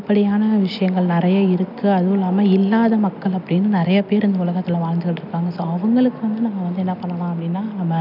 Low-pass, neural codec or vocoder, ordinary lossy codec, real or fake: 5.4 kHz; none; none; real